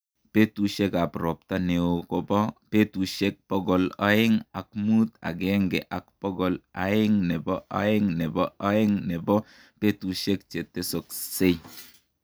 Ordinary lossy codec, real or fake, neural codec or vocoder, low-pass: none; real; none; none